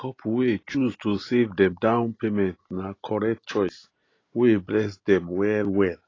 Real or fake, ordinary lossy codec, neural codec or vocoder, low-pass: real; AAC, 32 kbps; none; 7.2 kHz